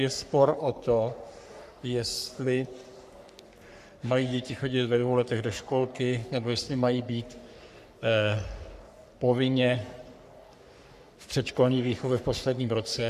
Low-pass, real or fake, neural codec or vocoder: 14.4 kHz; fake; codec, 44.1 kHz, 3.4 kbps, Pupu-Codec